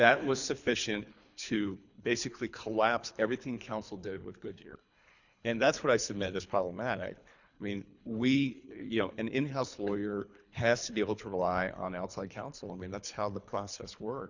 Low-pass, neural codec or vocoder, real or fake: 7.2 kHz; codec, 24 kHz, 3 kbps, HILCodec; fake